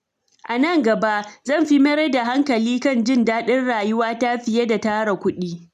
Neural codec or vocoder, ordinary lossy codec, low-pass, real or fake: none; none; 14.4 kHz; real